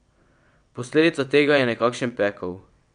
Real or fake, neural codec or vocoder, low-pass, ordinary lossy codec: fake; vocoder, 22.05 kHz, 80 mel bands, WaveNeXt; 9.9 kHz; none